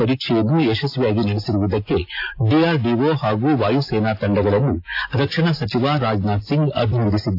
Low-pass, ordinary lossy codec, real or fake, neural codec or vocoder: 5.4 kHz; AAC, 32 kbps; real; none